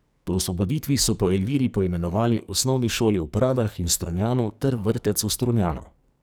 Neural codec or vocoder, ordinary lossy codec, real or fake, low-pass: codec, 44.1 kHz, 2.6 kbps, SNAC; none; fake; none